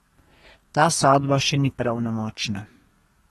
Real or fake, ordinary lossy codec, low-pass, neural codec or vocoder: fake; AAC, 32 kbps; 14.4 kHz; codec, 32 kHz, 1.9 kbps, SNAC